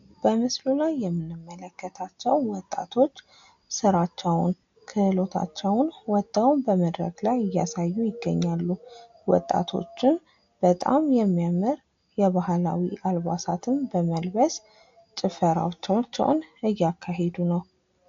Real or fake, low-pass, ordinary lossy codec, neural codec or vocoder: real; 7.2 kHz; AAC, 48 kbps; none